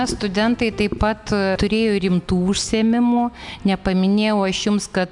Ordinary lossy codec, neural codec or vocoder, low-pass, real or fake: MP3, 96 kbps; none; 10.8 kHz; real